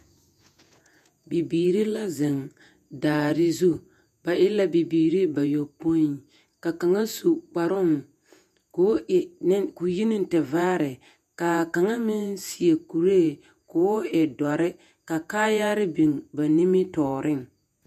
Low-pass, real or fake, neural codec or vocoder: 14.4 kHz; fake; vocoder, 48 kHz, 128 mel bands, Vocos